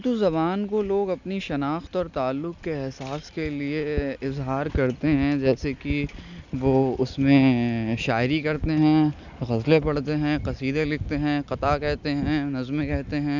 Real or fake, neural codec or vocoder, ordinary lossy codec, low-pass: real; none; none; 7.2 kHz